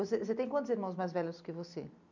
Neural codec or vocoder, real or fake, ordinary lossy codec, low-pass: none; real; none; 7.2 kHz